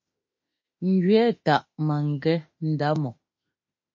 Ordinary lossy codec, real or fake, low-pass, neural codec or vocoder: MP3, 32 kbps; fake; 7.2 kHz; autoencoder, 48 kHz, 32 numbers a frame, DAC-VAE, trained on Japanese speech